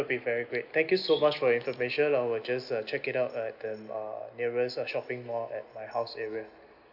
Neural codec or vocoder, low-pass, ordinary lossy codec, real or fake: none; 5.4 kHz; none; real